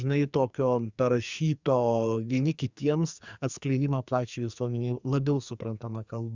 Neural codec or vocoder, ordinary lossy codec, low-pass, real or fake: codec, 44.1 kHz, 2.6 kbps, SNAC; Opus, 64 kbps; 7.2 kHz; fake